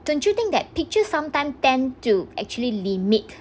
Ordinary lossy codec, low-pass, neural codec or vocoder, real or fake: none; none; none; real